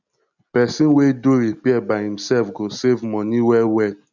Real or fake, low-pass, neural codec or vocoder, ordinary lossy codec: real; 7.2 kHz; none; none